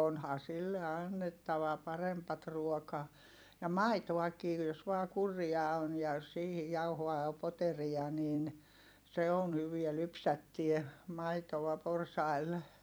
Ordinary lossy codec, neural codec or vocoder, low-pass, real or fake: none; vocoder, 44.1 kHz, 128 mel bands every 256 samples, BigVGAN v2; none; fake